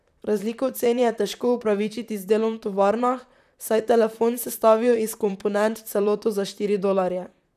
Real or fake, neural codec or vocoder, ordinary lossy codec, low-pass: fake; vocoder, 44.1 kHz, 128 mel bands, Pupu-Vocoder; none; 14.4 kHz